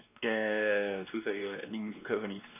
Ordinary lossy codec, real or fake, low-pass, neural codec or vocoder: none; fake; 3.6 kHz; codec, 16 kHz, 2 kbps, X-Codec, WavLM features, trained on Multilingual LibriSpeech